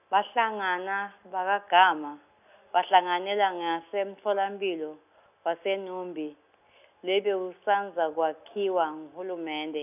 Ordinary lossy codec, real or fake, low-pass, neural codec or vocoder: none; real; 3.6 kHz; none